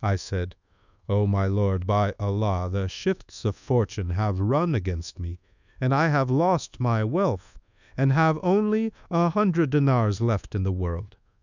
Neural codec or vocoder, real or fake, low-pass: codec, 24 kHz, 1.2 kbps, DualCodec; fake; 7.2 kHz